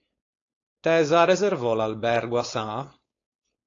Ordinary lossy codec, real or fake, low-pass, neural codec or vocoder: AAC, 32 kbps; fake; 7.2 kHz; codec, 16 kHz, 4.8 kbps, FACodec